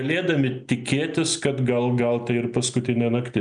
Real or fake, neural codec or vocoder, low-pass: real; none; 9.9 kHz